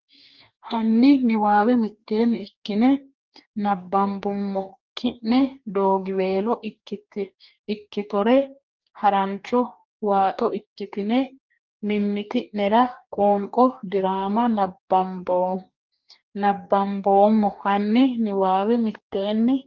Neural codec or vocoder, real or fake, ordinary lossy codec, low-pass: codec, 44.1 kHz, 2.6 kbps, DAC; fake; Opus, 24 kbps; 7.2 kHz